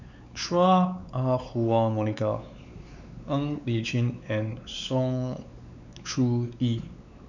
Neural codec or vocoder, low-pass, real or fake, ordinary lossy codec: codec, 16 kHz, 4 kbps, X-Codec, WavLM features, trained on Multilingual LibriSpeech; 7.2 kHz; fake; none